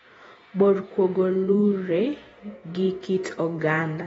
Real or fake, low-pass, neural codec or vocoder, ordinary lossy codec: fake; 19.8 kHz; vocoder, 48 kHz, 128 mel bands, Vocos; AAC, 24 kbps